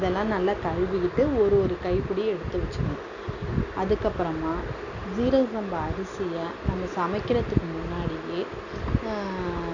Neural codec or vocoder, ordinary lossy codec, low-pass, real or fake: none; none; 7.2 kHz; real